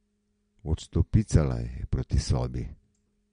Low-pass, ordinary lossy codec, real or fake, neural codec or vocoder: 9.9 kHz; MP3, 48 kbps; real; none